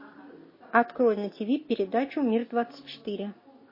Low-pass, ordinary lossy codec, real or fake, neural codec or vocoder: 5.4 kHz; MP3, 24 kbps; real; none